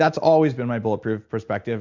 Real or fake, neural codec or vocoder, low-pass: real; none; 7.2 kHz